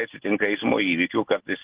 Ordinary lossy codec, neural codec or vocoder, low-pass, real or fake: Opus, 16 kbps; none; 3.6 kHz; real